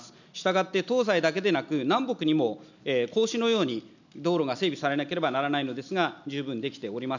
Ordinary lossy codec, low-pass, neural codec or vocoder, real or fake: none; 7.2 kHz; none; real